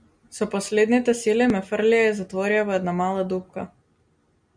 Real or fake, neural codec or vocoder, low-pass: real; none; 9.9 kHz